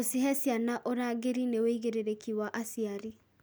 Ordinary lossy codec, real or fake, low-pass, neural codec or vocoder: none; real; none; none